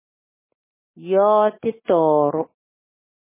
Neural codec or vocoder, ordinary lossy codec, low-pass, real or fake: none; MP3, 16 kbps; 3.6 kHz; real